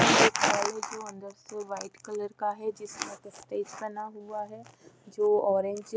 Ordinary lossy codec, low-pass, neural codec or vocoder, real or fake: none; none; none; real